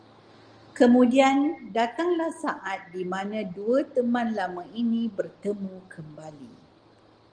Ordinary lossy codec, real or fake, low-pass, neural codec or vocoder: Opus, 24 kbps; real; 9.9 kHz; none